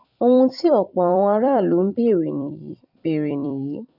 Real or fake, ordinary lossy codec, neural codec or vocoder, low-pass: fake; none; vocoder, 44.1 kHz, 128 mel bands every 256 samples, BigVGAN v2; 5.4 kHz